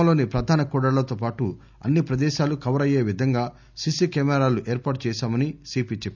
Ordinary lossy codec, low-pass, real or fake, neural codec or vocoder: none; 7.2 kHz; real; none